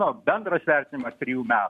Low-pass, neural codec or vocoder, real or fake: 14.4 kHz; none; real